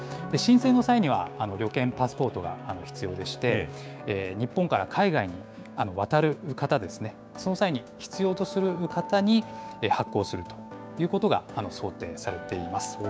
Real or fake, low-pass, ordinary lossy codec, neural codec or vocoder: fake; none; none; codec, 16 kHz, 6 kbps, DAC